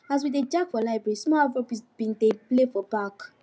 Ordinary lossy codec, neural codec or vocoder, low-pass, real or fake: none; none; none; real